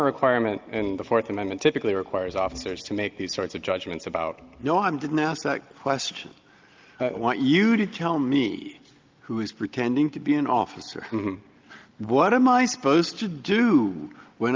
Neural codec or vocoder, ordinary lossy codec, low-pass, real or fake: none; Opus, 32 kbps; 7.2 kHz; real